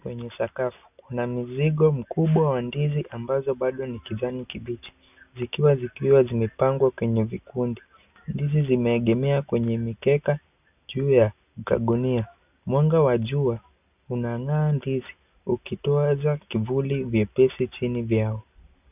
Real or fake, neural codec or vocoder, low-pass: real; none; 3.6 kHz